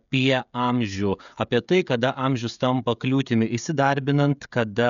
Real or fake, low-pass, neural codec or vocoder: fake; 7.2 kHz; codec, 16 kHz, 16 kbps, FreqCodec, smaller model